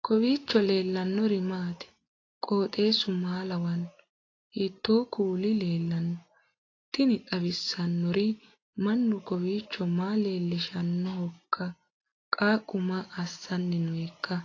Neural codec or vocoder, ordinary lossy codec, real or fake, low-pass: none; AAC, 32 kbps; real; 7.2 kHz